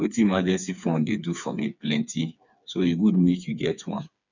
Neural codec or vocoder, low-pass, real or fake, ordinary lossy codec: codec, 16 kHz, 4 kbps, FreqCodec, smaller model; 7.2 kHz; fake; none